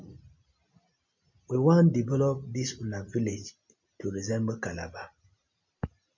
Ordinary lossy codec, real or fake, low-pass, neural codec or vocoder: MP3, 48 kbps; real; 7.2 kHz; none